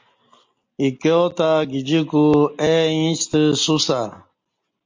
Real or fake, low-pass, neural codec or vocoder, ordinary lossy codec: real; 7.2 kHz; none; MP3, 48 kbps